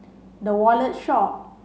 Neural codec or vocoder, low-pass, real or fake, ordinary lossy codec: none; none; real; none